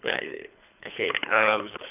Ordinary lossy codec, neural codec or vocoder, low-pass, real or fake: none; codec, 24 kHz, 3 kbps, HILCodec; 3.6 kHz; fake